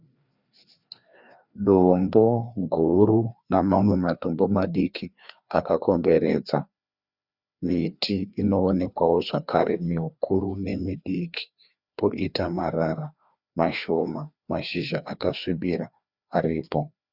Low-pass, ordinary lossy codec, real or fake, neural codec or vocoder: 5.4 kHz; Opus, 64 kbps; fake; codec, 16 kHz, 2 kbps, FreqCodec, larger model